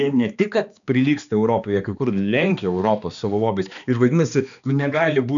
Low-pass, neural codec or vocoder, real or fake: 7.2 kHz; codec, 16 kHz, 2 kbps, X-Codec, HuBERT features, trained on balanced general audio; fake